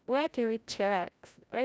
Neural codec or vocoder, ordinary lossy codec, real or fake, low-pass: codec, 16 kHz, 0.5 kbps, FreqCodec, larger model; none; fake; none